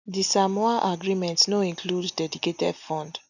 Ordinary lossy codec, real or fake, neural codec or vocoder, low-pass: none; real; none; 7.2 kHz